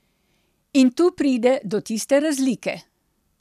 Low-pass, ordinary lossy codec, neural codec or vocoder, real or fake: 14.4 kHz; none; none; real